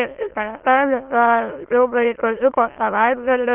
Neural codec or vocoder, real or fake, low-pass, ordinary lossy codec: autoencoder, 22.05 kHz, a latent of 192 numbers a frame, VITS, trained on many speakers; fake; 3.6 kHz; Opus, 24 kbps